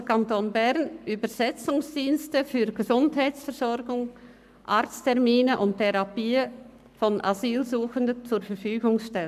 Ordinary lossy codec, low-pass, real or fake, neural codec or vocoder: none; 14.4 kHz; fake; codec, 44.1 kHz, 7.8 kbps, Pupu-Codec